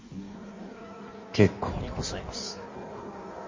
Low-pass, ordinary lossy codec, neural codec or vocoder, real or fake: 7.2 kHz; MP3, 32 kbps; codec, 16 kHz in and 24 kHz out, 1.1 kbps, FireRedTTS-2 codec; fake